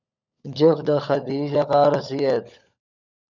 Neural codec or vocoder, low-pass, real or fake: codec, 16 kHz, 16 kbps, FunCodec, trained on LibriTTS, 50 frames a second; 7.2 kHz; fake